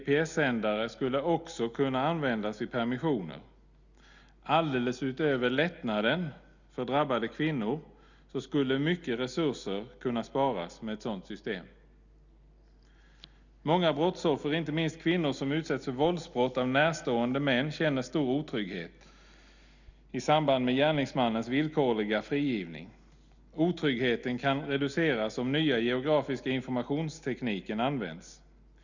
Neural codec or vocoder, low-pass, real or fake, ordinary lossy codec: none; 7.2 kHz; real; none